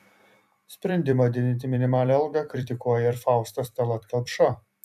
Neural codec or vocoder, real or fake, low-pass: vocoder, 44.1 kHz, 128 mel bands every 256 samples, BigVGAN v2; fake; 14.4 kHz